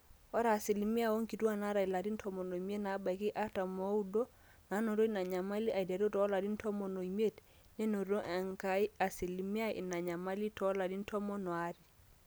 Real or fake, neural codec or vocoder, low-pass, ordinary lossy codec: real; none; none; none